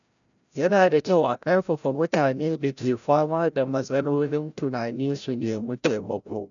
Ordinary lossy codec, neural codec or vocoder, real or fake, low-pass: none; codec, 16 kHz, 0.5 kbps, FreqCodec, larger model; fake; 7.2 kHz